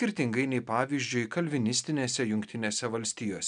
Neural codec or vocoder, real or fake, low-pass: none; real; 9.9 kHz